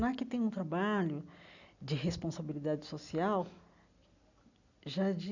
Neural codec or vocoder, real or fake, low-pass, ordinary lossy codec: none; real; 7.2 kHz; none